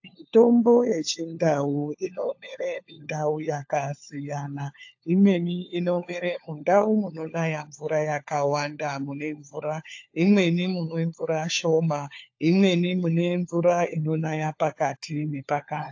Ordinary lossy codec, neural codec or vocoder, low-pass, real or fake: AAC, 48 kbps; codec, 16 kHz, 4 kbps, FunCodec, trained on LibriTTS, 50 frames a second; 7.2 kHz; fake